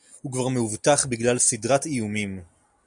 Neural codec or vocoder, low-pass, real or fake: none; 10.8 kHz; real